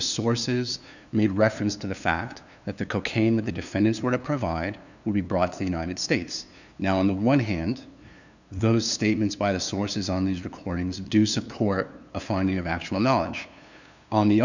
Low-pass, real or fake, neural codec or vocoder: 7.2 kHz; fake; codec, 16 kHz, 2 kbps, FunCodec, trained on LibriTTS, 25 frames a second